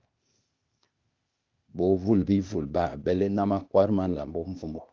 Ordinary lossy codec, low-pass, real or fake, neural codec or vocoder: Opus, 24 kbps; 7.2 kHz; fake; codec, 16 kHz, 0.8 kbps, ZipCodec